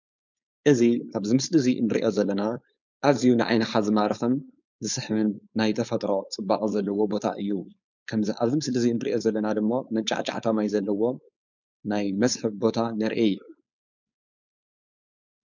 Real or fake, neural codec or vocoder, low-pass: fake; codec, 16 kHz, 4.8 kbps, FACodec; 7.2 kHz